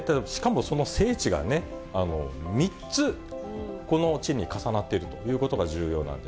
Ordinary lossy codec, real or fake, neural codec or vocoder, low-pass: none; real; none; none